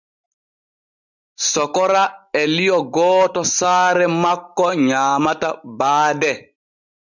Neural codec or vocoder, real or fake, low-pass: none; real; 7.2 kHz